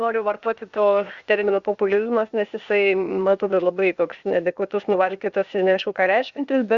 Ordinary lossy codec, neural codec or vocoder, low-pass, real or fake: Opus, 64 kbps; codec, 16 kHz, 0.8 kbps, ZipCodec; 7.2 kHz; fake